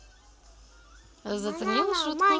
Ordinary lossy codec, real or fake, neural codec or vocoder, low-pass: none; real; none; none